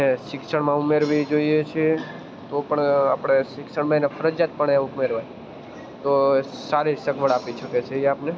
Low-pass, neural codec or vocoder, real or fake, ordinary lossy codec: none; none; real; none